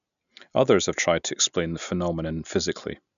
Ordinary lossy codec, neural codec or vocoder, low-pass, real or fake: none; none; 7.2 kHz; real